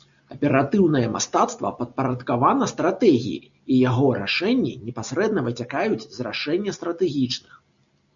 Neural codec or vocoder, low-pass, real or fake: none; 7.2 kHz; real